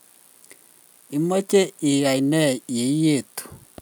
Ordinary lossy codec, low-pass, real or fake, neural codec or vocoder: none; none; real; none